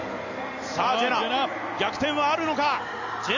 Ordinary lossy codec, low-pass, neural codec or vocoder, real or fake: none; 7.2 kHz; none; real